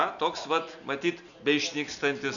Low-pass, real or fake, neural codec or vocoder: 7.2 kHz; real; none